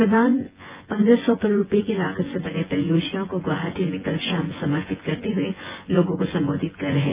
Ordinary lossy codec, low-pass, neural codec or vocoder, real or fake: Opus, 24 kbps; 3.6 kHz; vocoder, 24 kHz, 100 mel bands, Vocos; fake